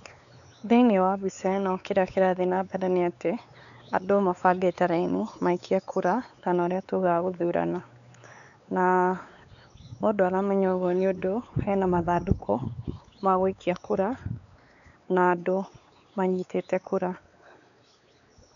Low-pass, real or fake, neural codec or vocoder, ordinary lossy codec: 7.2 kHz; fake; codec, 16 kHz, 4 kbps, X-Codec, WavLM features, trained on Multilingual LibriSpeech; none